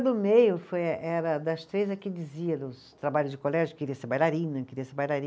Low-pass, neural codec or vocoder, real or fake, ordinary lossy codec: none; none; real; none